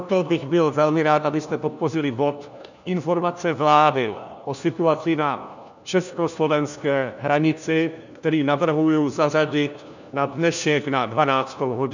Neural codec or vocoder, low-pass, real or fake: codec, 16 kHz, 1 kbps, FunCodec, trained on LibriTTS, 50 frames a second; 7.2 kHz; fake